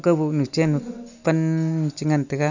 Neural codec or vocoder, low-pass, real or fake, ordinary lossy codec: none; 7.2 kHz; real; none